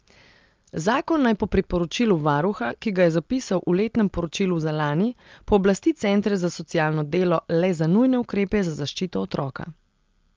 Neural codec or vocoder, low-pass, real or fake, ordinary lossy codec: none; 7.2 kHz; real; Opus, 32 kbps